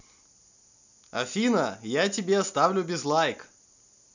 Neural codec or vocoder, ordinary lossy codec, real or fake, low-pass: none; none; real; 7.2 kHz